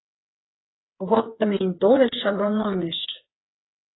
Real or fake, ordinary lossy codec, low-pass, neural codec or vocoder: fake; AAC, 16 kbps; 7.2 kHz; codec, 16 kHz, 4 kbps, FreqCodec, smaller model